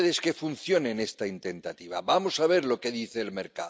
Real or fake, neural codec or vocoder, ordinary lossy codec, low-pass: real; none; none; none